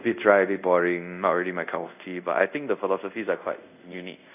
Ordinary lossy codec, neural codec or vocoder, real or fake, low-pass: none; codec, 24 kHz, 0.5 kbps, DualCodec; fake; 3.6 kHz